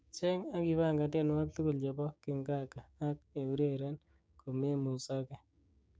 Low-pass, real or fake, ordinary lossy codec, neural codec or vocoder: none; fake; none; codec, 16 kHz, 6 kbps, DAC